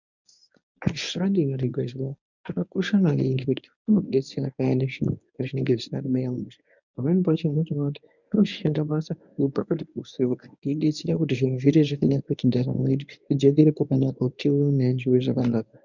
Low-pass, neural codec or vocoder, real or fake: 7.2 kHz; codec, 24 kHz, 0.9 kbps, WavTokenizer, medium speech release version 1; fake